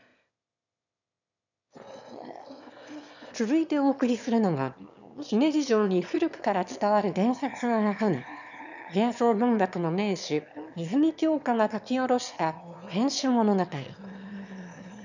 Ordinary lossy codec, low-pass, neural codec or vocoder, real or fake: none; 7.2 kHz; autoencoder, 22.05 kHz, a latent of 192 numbers a frame, VITS, trained on one speaker; fake